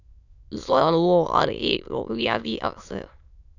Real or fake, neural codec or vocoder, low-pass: fake; autoencoder, 22.05 kHz, a latent of 192 numbers a frame, VITS, trained on many speakers; 7.2 kHz